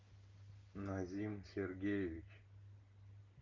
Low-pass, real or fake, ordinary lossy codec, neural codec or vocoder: 7.2 kHz; real; Opus, 24 kbps; none